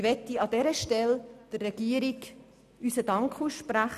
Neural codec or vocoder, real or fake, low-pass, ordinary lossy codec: none; real; 14.4 kHz; none